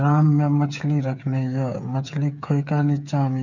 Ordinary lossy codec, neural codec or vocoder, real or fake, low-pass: none; codec, 16 kHz, 8 kbps, FreqCodec, smaller model; fake; 7.2 kHz